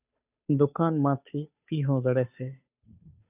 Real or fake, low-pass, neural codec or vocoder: fake; 3.6 kHz; codec, 16 kHz, 2 kbps, FunCodec, trained on Chinese and English, 25 frames a second